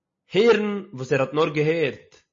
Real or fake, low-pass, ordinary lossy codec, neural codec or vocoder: real; 7.2 kHz; MP3, 32 kbps; none